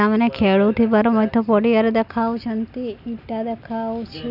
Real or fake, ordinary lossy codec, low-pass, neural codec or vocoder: real; none; 5.4 kHz; none